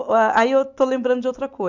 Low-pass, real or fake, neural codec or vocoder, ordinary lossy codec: 7.2 kHz; real; none; none